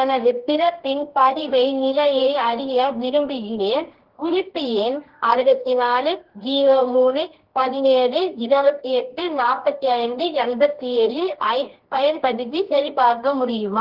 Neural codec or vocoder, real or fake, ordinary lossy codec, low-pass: codec, 24 kHz, 0.9 kbps, WavTokenizer, medium music audio release; fake; Opus, 16 kbps; 5.4 kHz